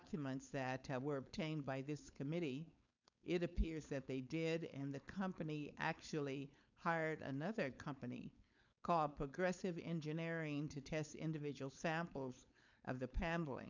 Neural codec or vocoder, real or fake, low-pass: codec, 16 kHz, 4.8 kbps, FACodec; fake; 7.2 kHz